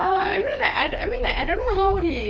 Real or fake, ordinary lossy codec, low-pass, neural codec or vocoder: fake; none; none; codec, 16 kHz, 2 kbps, FreqCodec, larger model